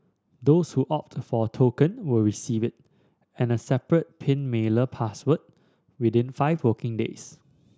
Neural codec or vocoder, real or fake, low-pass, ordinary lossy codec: none; real; none; none